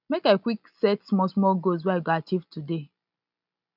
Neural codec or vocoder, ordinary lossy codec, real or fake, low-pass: none; none; real; 5.4 kHz